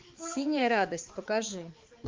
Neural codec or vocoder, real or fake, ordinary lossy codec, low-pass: codec, 16 kHz, 4 kbps, X-Codec, HuBERT features, trained on balanced general audio; fake; Opus, 24 kbps; 7.2 kHz